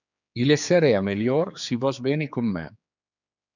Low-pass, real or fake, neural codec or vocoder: 7.2 kHz; fake; codec, 16 kHz, 4 kbps, X-Codec, HuBERT features, trained on general audio